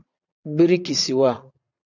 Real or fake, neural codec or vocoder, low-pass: fake; vocoder, 22.05 kHz, 80 mel bands, Vocos; 7.2 kHz